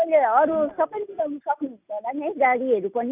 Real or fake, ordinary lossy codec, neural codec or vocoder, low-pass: real; none; none; 3.6 kHz